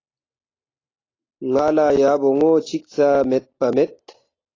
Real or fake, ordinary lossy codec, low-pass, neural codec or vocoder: real; AAC, 32 kbps; 7.2 kHz; none